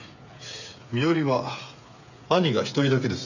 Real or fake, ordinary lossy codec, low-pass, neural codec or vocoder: fake; none; 7.2 kHz; codec, 16 kHz, 8 kbps, FreqCodec, smaller model